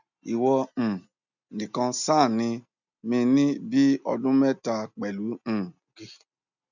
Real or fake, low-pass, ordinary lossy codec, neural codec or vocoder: real; 7.2 kHz; none; none